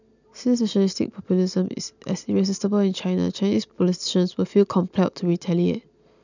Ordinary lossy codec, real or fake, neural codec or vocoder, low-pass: none; real; none; 7.2 kHz